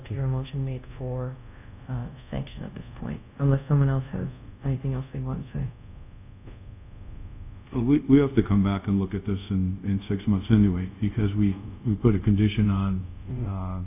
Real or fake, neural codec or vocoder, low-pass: fake; codec, 24 kHz, 0.5 kbps, DualCodec; 3.6 kHz